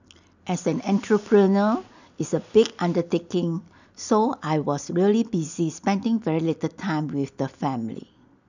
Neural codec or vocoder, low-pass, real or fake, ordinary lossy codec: none; 7.2 kHz; real; none